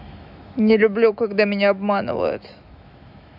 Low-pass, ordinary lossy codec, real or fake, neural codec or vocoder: 5.4 kHz; none; fake; autoencoder, 48 kHz, 128 numbers a frame, DAC-VAE, trained on Japanese speech